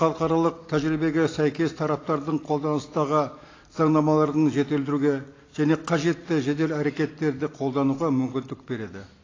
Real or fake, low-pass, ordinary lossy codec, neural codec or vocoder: real; 7.2 kHz; AAC, 32 kbps; none